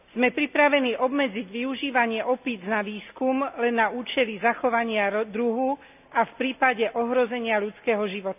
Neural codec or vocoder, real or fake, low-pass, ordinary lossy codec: none; real; 3.6 kHz; none